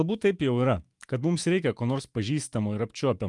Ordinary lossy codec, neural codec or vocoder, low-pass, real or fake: Opus, 32 kbps; autoencoder, 48 kHz, 128 numbers a frame, DAC-VAE, trained on Japanese speech; 10.8 kHz; fake